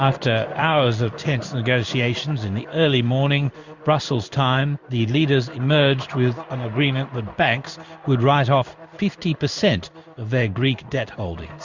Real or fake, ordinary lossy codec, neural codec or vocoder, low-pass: fake; Opus, 64 kbps; codec, 16 kHz in and 24 kHz out, 1 kbps, XY-Tokenizer; 7.2 kHz